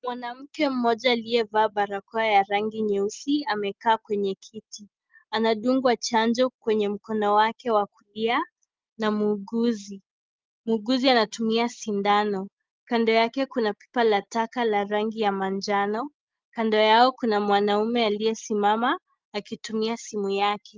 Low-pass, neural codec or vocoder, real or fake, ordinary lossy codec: 7.2 kHz; none; real; Opus, 32 kbps